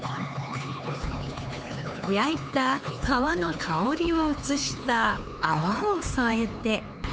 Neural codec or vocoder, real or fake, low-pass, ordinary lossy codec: codec, 16 kHz, 4 kbps, X-Codec, HuBERT features, trained on LibriSpeech; fake; none; none